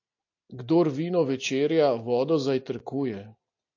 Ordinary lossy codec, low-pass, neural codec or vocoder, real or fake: AAC, 48 kbps; 7.2 kHz; none; real